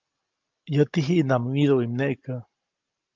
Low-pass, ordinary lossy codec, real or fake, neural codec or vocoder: 7.2 kHz; Opus, 24 kbps; real; none